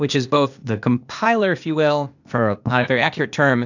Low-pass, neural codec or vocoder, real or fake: 7.2 kHz; codec, 16 kHz, 0.8 kbps, ZipCodec; fake